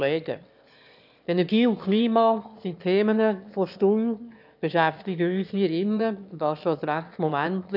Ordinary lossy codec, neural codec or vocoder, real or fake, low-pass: none; autoencoder, 22.05 kHz, a latent of 192 numbers a frame, VITS, trained on one speaker; fake; 5.4 kHz